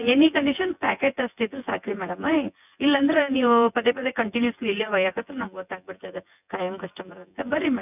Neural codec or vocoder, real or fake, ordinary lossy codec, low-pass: vocoder, 24 kHz, 100 mel bands, Vocos; fake; none; 3.6 kHz